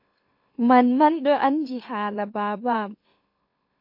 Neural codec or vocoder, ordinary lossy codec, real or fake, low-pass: autoencoder, 44.1 kHz, a latent of 192 numbers a frame, MeloTTS; MP3, 32 kbps; fake; 5.4 kHz